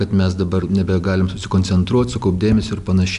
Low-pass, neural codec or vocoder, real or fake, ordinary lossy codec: 10.8 kHz; none; real; AAC, 96 kbps